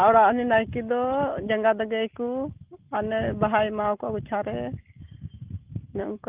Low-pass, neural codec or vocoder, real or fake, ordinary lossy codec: 3.6 kHz; none; real; Opus, 32 kbps